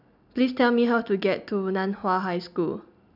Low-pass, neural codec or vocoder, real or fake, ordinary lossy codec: 5.4 kHz; none; real; none